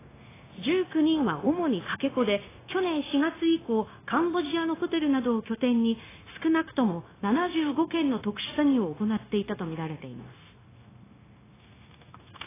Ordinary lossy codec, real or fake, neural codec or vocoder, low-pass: AAC, 16 kbps; fake; codec, 16 kHz, 0.9 kbps, LongCat-Audio-Codec; 3.6 kHz